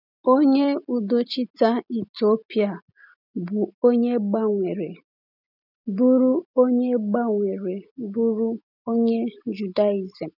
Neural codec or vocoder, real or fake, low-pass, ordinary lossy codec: none; real; 5.4 kHz; none